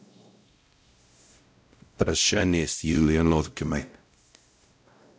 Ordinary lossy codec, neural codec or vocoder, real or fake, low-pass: none; codec, 16 kHz, 0.5 kbps, X-Codec, WavLM features, trained on Multilingual LibriSpeech; fake; none